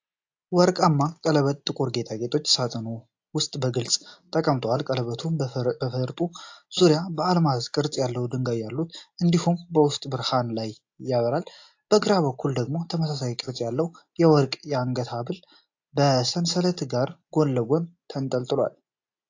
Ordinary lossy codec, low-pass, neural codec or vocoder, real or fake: AAC, 48 kbps; 7.2 kHz; none; real